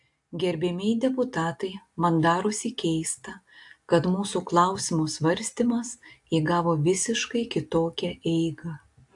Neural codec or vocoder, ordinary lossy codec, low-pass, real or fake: none; AAC, 64 kbps; 10.8 kHz; real